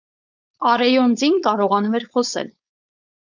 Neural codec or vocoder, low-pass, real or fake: codec, 16 kHz, 4.8 kbps, FACodec; 7.2 kHz; fake